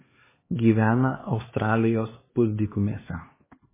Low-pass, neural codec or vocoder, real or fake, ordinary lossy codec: 3.6 kHz; codec, 16 kHz, 2 kbps, X-Codec, HuBERT features, trained on LibriSpeech; fake; MP3, 16 kbps